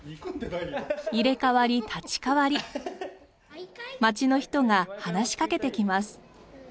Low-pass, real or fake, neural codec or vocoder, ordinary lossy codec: none; real; none; none